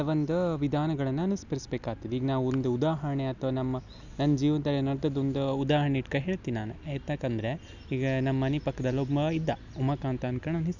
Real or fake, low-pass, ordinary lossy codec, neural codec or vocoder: real; 7.2 kHz; none; none